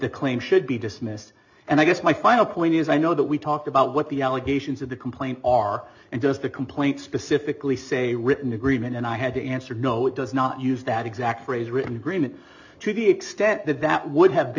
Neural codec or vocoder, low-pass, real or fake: none; 7.2 kHz; real